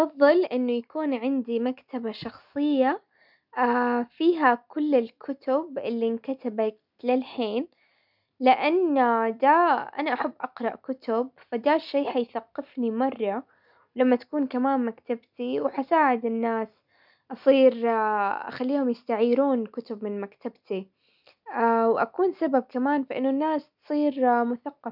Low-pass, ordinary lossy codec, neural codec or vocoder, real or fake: 5.4 kHz; none; none; real